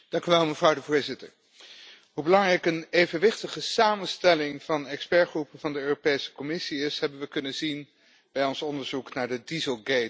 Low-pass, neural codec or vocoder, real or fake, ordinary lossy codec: none; none; real; none